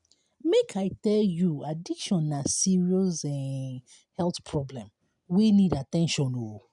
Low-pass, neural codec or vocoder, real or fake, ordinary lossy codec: 10.8 kHz; none; real; none